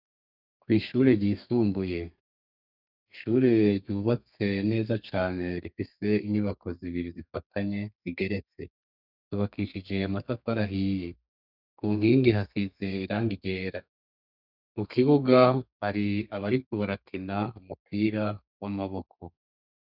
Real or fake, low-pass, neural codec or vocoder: fake; 5.4 kHz; codec, 32 kHz, 1.9 kbps, SNAC